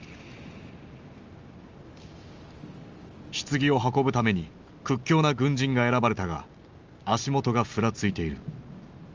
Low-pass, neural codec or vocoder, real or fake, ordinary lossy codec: 7.2 kHz; none; real; Opus, 32 kbps